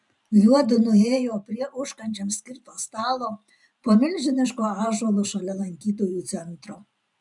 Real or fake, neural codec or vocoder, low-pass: fake; vocoder, 44.1 kHz, 128 mel bands every 512 samples, BigVGAN v2; 10.8 kHz